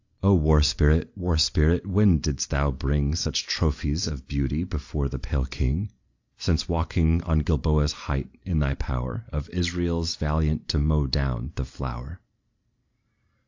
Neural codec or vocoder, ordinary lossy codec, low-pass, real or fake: none; AAC, 48 kbps; 7.2 kHz; real